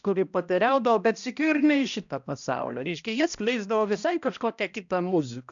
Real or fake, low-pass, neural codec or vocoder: fake; 7.2 kHz; codec, 16 kHz, 1 kbps, X-Codec, HuBERT features, trained on balanced general audio